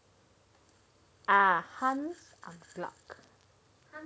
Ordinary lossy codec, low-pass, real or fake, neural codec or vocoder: none; none; real; none